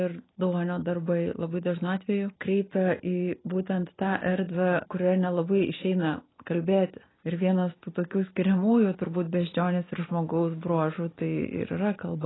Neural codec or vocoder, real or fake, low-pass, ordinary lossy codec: none; real; 7.2 kHz; AAC, 16 kbps